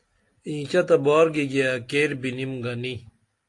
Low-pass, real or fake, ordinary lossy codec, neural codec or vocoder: 10.8 kHz; real; AAC, 48 kbps; none